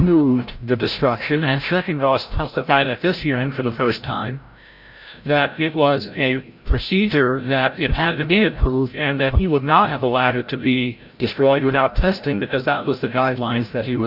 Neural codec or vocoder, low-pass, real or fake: codec, 16 kHz, 0.5 kbps, FreqCodec, larger model; 5.4 kHz; fake